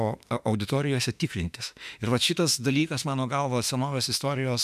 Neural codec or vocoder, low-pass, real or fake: autoencoder, 48 kHz, 32 numbers a frame, DAC-VAE, trained on Japanese speech; 14.4 kHz; fake